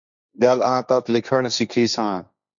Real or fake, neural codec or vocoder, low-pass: fake; codec, 16 kHz, 1.1 kbps, Voila-Tokenizer; 7.2 kHz